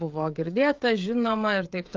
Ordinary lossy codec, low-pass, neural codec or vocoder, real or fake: Opus, 24 kbps; 7.2 kHz; codec, 16 kHz, 16 kbps, FreqCodec, smaller model; fake